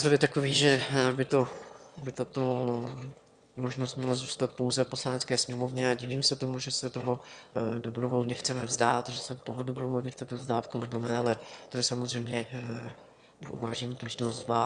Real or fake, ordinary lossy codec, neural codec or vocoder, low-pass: fake; Opus, 64 kbps; autoencoder, 22.05 kHz, a latent of 192 numbers a frame, VITS, trained on one speaker; 9.9 kHz